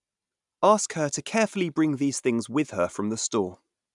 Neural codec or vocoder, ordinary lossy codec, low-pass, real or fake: none; none; 10.8 kHz; real